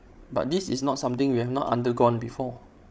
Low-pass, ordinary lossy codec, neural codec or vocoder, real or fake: none; none; codec, 16 kHz, 16 kbps, FreqCodec, larger model; fake